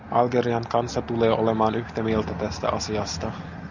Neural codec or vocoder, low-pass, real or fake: none; 7.2 kHz; real